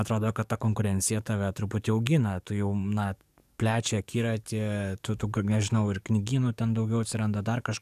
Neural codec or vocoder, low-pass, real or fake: codec, 44.1 kHz, 7.8 kbps, DAC; 14.4 kHz; fake